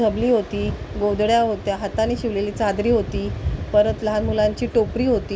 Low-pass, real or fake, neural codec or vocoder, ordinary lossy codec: none; real; none; none